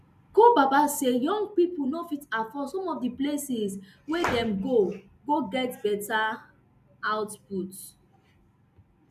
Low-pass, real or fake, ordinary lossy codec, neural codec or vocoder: 14.4 kHz; real; none; none